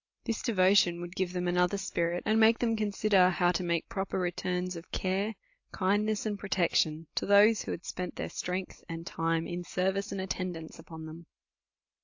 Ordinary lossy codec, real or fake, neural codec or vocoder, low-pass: AAC, 48 kbps; real; none; 7.2 kHz